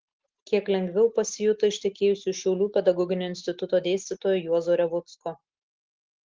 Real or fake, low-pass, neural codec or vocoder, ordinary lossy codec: real; 7.2 kHz; none; Opus, 16 kbps